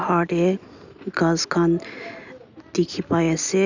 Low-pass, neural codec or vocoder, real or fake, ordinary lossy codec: 7.2 kHz; none; real; none